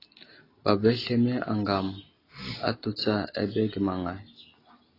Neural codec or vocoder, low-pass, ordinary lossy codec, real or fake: none; 5.4 kHz; AAC, 24 kbps; real